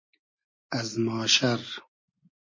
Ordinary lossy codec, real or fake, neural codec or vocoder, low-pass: MP3, 32 kbps; real; none; 7.2 kHz